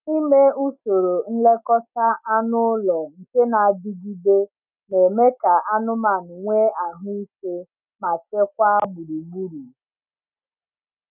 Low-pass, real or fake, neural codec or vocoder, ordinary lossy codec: 3.6 kHz; real; none; none